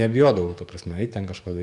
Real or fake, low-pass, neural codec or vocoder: fake; 10.8 kHz; codec, 44.1 kHz, 7.8 kbps, DAC